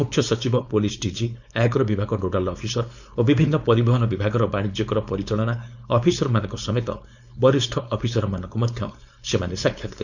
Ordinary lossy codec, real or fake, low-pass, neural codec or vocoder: none; fake; 7.2 kHz; codec, 16 kHz, 4.8 kbps, FACodec